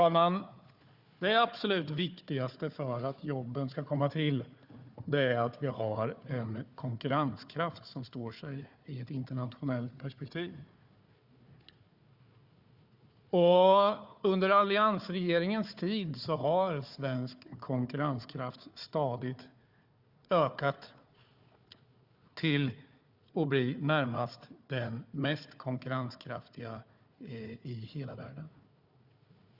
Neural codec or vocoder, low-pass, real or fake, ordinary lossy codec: codec, 16 kHz, 4 kbps, FunCodec, trained on Chinese and English, 50 frames a second; 5.4 kHz; fake; Opus, 64 kbps